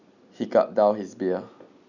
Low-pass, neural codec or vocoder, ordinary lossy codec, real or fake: 7.2 kHz; none; none; real